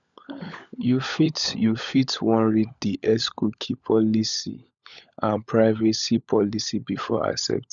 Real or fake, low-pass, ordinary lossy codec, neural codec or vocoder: fake; 7.2 kHz; none; codec, 16 kHz, 16 kbps, FunCodec, trained on LibriTTS, 50 frames a second